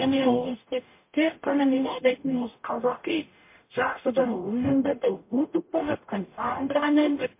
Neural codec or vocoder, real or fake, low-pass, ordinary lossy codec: codec, 44.1 kHz, 0.9 kbps, DAC; fake; 3.6 kHz; MP3, 24 kbps